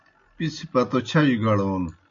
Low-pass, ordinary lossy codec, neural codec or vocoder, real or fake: 7.2 kHz; MP3, 48 kbps; none; real